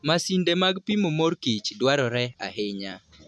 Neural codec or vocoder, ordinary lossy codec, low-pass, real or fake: none; none; none; real